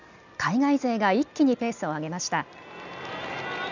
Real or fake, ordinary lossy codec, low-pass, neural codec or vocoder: real; none; 7.2 kHz; none